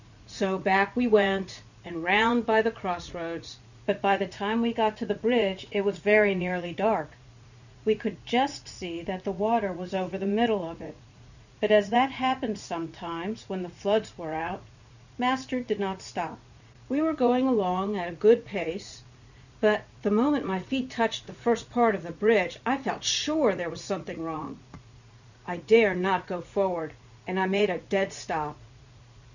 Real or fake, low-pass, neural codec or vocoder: fake; 7.2 kHz; vocoder, 44.1 kHz, 80 mel bands, Vocos